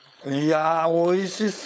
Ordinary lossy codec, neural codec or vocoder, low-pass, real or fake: none; codec, 16 kHz, 4.8 kbps, FACodec; none; fake